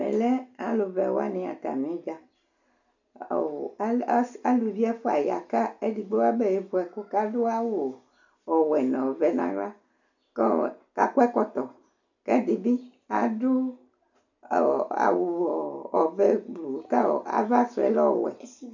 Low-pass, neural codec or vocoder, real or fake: 7.2 kHz; none; real